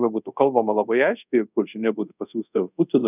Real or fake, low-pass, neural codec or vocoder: fake; 3.6 kHz; codec, 24 kHz, 0.5 kbps, DualCodec